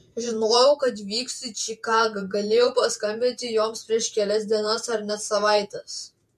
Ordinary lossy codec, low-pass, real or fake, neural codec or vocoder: MP3, 64 kbps; 14.4 kHz; fake; vocoder, 48 kHz, 128 mel bands, Vocos